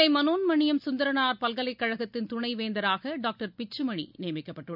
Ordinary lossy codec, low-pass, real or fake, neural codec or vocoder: none; 5.4 kHz; real; none